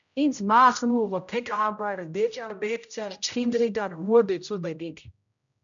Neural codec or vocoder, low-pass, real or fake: codec, 16 kHz, 0.5 kbps, X-Codec, HuBERT features, trained on general audio; 7.2 kHz; fake